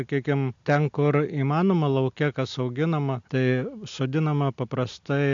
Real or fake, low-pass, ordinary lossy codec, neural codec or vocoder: real; 7.2 kHz; AAC, 64 kbps; none